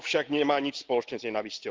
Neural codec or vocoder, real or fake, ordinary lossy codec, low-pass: none; real; Opus, 16 kbps; 7.2 kHz